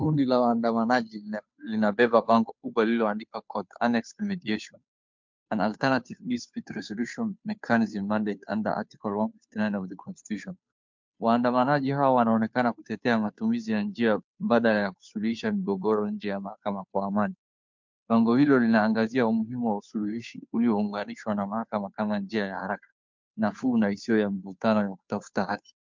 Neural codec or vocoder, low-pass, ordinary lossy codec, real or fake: codec, 16 kHz, 2 kbps, FunCodec, trained on Chinese and English, 25 frames a second; 7.2 kHz; MP3, 64 kbps; fake